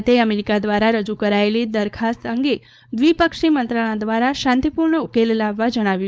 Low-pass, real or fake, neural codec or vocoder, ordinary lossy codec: none; fake; codec, 16 kHz, 4.8 kbps, FACodec; none